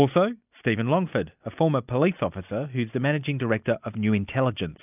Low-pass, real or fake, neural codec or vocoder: 3.6 kHz; real; none